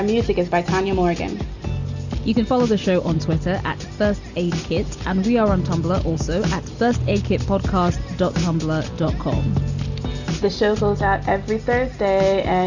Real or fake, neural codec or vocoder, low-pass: real; none; 7.2 kHz